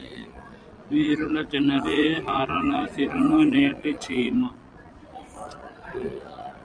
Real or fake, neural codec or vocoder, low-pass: fake; vocoder, 22.05 kHz, 80 mel bands, Vocos; 9.9 kHz